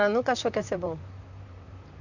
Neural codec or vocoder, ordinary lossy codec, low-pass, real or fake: vocoder, 44.1 kHz, 128 mel bands, Pupu-Vocoder; none; 7.2 kHz; fake